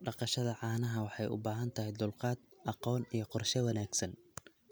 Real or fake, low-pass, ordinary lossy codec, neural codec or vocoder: real; none; none; none